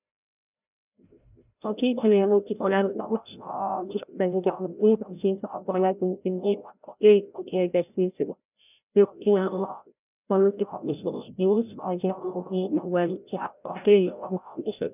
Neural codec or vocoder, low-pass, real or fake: codec, 16 kHz, 0.5 kbps, FreqCodec, larger model; 3.6 kHz; fake